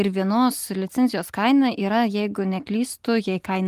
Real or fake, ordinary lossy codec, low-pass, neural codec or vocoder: real; Opus, 24 kbps; 14.4 kHz; none